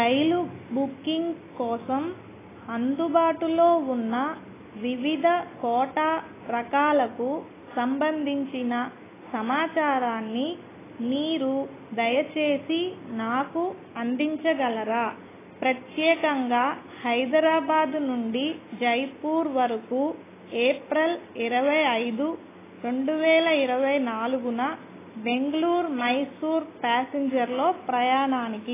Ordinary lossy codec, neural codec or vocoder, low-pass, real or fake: AAC, 16 kbps; none; 3.6 kHz; real